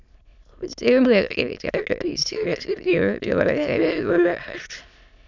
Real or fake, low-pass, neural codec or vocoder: fake; 7.2 kHz; autoencoder, 22.05 kHz, a latent of 192 numbers a frame, VITS, trained on many speakers